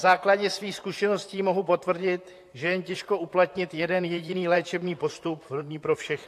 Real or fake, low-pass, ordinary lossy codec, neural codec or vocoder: fake; 14.4 kHz; AAC, 64 kbps; vocoder, 44.1 kHz, 128 mel bands, Pupu-Vocoder